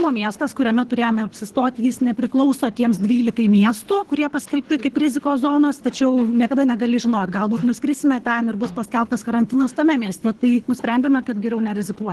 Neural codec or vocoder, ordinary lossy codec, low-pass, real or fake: codec, 24 kHz, 3 kbps, HILCodec; Opus, 16 kbps; 10.8 kHz; fake